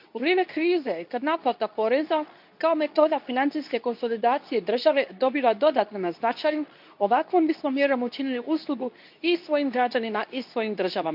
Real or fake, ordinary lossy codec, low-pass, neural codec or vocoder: fake; none; 5.4 kHz; codec, 24 kHz, 0.9 kbps, WavTokenizer, medium speech release version 2